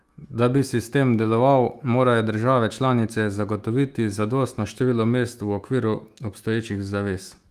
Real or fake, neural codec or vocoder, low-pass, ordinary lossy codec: fake; autoencoder, 48 kHz, 128 numbers a frame, DAC-VAE, trained on Japanese speech; 14.4 kHz; Opus, 32 kbps